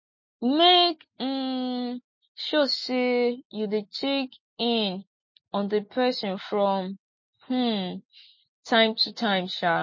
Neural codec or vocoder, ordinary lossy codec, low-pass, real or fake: none; MP3, 32 kbps; 7.2 kHz; real